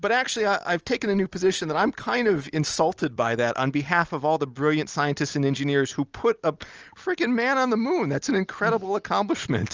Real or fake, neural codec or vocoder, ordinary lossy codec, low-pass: real; none; Opus, 24 kbps; 7.2 kHz